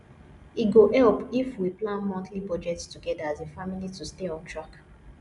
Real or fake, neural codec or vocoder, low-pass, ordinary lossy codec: real; none; 10.8 kHz; none